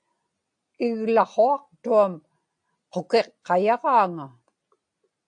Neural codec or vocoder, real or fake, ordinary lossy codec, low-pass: none; real; AAC, 64 kbps; 9.9 kHz